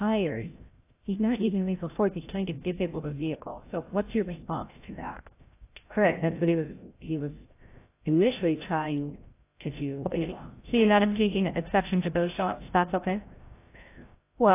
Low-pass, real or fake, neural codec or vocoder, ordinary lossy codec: 3.6 kHz; fake; codec, 16 kHz, 0.5 kbps, FreqCodec, larger model; AAC, 24 kbps